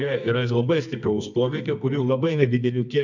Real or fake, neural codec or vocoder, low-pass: fake; codec, 24 kHz, 0.9 kbps, WavTokenizer, medium music audio release; 7.2 kHz